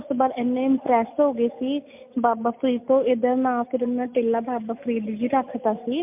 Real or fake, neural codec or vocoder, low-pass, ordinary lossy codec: real; none; 3.6 kHz; MP3, 32 kbps